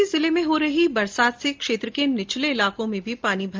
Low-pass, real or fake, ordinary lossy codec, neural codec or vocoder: 7.2 kHz; real; Opus, 32 kbps; none